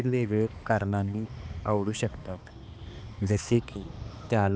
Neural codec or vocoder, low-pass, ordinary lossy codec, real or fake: codec, 16 kHz, 2 kbps, X-Codec, HuBERT features, trained on balanced general audio; none; none; fake